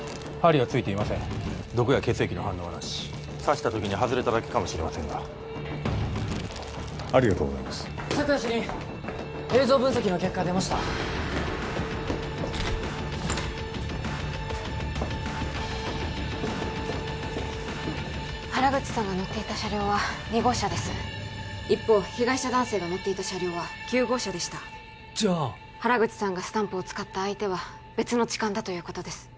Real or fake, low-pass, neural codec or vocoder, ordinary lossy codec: real; none; none; none